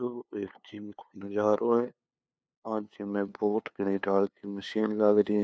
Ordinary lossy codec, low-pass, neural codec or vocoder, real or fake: none; none; codec, 16 kHz, 2 kbps, FunCodec, trained on LibriTTS, 25 frames a second; fake